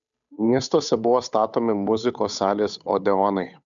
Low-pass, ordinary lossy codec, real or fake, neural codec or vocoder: 7.2 kHz; MP3, 96 kbps; fake; codec, 16 kHz, 8 kbps, FunCodec, trained on Chinese and English, 25 frames a second